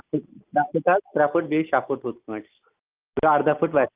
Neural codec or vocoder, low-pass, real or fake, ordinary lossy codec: none; 3.6 kHz; real; Opus, 32 kbps